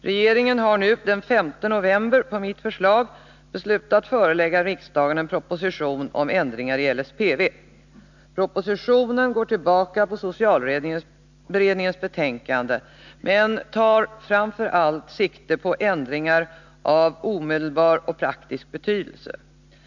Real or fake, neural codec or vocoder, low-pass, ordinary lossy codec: real; none; 7.2 kHz; none